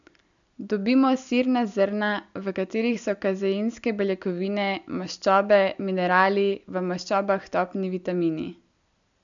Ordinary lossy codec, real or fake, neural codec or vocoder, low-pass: none; real; none; 7.2 kHz